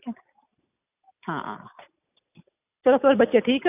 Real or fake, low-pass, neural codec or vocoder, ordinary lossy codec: real; 3.6 kHz; none; none